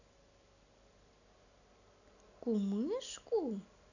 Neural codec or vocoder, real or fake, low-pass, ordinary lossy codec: none; real; 7.2 kHz; none